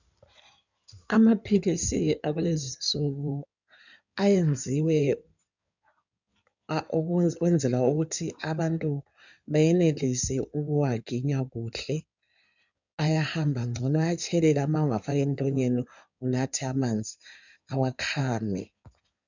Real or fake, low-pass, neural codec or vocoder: fake; 7.2 kHz; codec, 16 kHz in and 24 kHz out, 2.2 kbps, FireRedTTS-2 codec